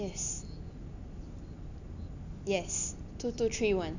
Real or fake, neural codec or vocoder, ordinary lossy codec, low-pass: real; none; none; 7.2 kHz